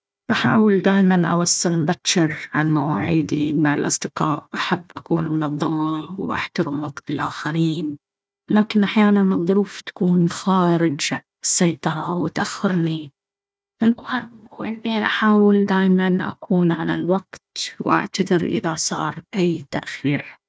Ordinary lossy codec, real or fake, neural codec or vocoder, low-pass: none; fake; codec, 16 kHz, 1 kbps, FunCodec, trained on Chinese and English, 50 frames a second; none